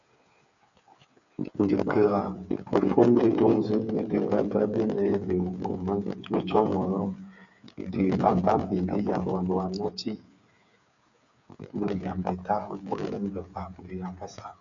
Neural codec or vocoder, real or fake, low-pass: codec, 16 kHz, 8 kbps, FreqCodec, smaller model; fake; 7.2 kHz